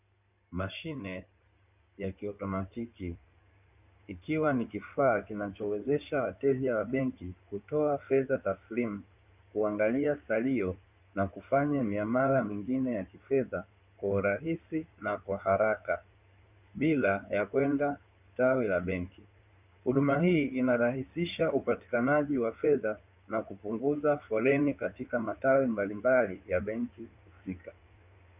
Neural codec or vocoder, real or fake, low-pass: codec, 16 kHz in and 24 kHz out, 2.2 kbps, FireRedTTS-2 codec; fake; 3.6 kHz